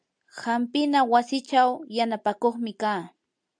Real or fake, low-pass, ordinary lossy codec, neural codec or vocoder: real; 9.9 kHz; MP3, 64 kbps; none